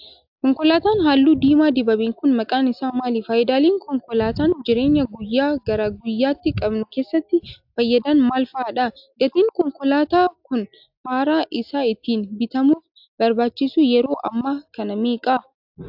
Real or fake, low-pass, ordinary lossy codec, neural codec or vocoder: real; 5.4 kHz; AAC, 48 kbps; none